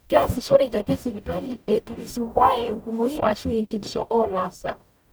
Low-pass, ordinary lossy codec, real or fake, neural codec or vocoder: none; none; fake; codec, 44.1 kHz, 0.9 kbps, DAC